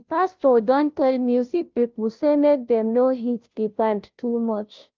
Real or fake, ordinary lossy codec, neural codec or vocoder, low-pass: fake; Opus, 24 kbps; codec, 16 kHz, 0.5 kbps, FunCodec, trained on Chinese and English, 25 frames a second; 7.2 kHz